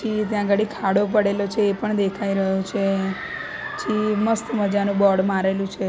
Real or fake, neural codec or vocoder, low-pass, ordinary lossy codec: real; none; none; none